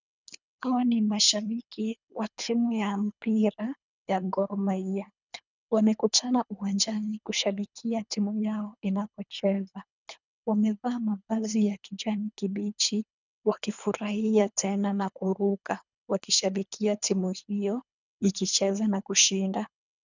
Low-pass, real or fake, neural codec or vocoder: 7.2 kHz; fake; codec, 24 kHz, 3 kbps, HILCodec